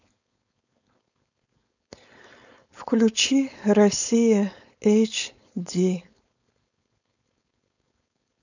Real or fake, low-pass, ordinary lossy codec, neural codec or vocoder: fake; 7.2 kHz; none; codec, 16 kHz, 4.8 kbps, FACodec